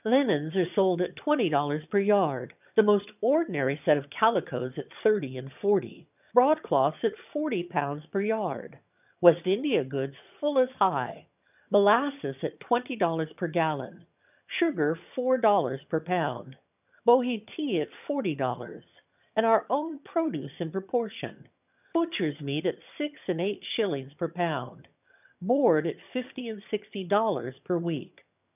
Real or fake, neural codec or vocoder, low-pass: fake; vocoder, 22.05 kHz, 80 mel bands, HiFi-GAN; 3.6 kHz